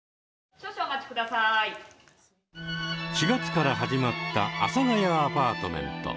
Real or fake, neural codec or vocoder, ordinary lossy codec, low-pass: real; none; none; none